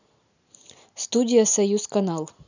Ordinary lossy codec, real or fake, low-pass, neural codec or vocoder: none; real; 7.2 kHz; none